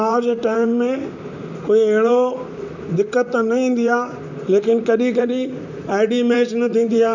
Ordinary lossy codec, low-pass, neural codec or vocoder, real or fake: none; 7.2 kHz; vocoder, 44.1 kHz, 128 mel bands, Pupu-Vocoder; fake